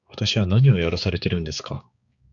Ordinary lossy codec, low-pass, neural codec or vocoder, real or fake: Opus, 64 kbps; 7.2 kHz; codec, 16 kHz, 4 kbps, X-Codec, HuBERT features, trained on balanced general audio; fake